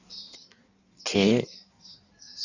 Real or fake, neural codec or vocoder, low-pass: fake; codec, 16 kHz in and 24 kHz out, 1.1 kbps, FireRedTTS-2 codec; 7.2 kHz